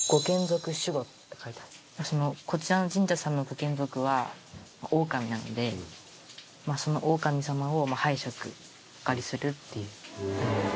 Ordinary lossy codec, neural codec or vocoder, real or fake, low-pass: none; none; real; none